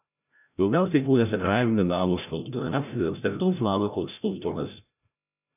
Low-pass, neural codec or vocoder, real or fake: 3.6 kHz; codec, 16 kHz, 0.5 kbps, FreqCodec, larger model; fake